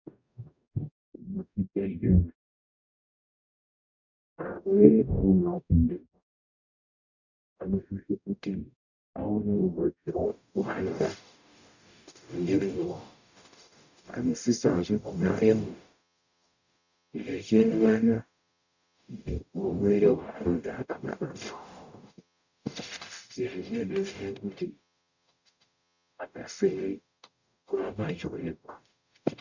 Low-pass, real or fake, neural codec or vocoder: 7.2 kHz; fake; codec, 44.1 kHz, 0.9 kbps, DAC